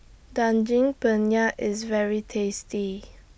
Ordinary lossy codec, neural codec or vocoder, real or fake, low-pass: none; none; real; none